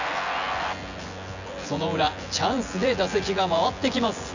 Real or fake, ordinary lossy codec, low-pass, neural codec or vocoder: fake; none; 7.2 kHz; vocoder, 24 kHz, 100 mel bands, Vocos